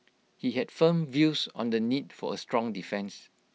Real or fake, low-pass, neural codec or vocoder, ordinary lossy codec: real; none; none; none